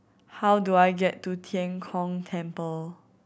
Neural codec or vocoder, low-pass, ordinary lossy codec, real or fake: none; none; none; real